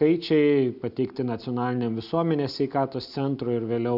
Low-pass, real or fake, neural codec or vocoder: 5.4 kHz; real; none